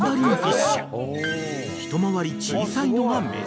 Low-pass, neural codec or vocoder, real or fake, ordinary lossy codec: none; none; real; none